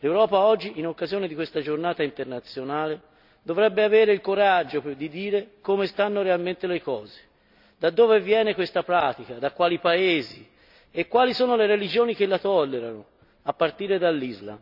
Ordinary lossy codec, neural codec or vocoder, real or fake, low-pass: none; none; real; 5.4 kHz